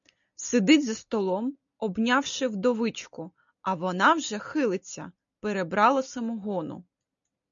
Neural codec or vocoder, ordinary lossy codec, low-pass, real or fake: none; MP3, 96 kbps; 7.2 kHz; real